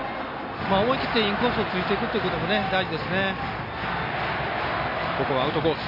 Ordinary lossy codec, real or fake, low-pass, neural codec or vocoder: none; real; 5.4 kHz; none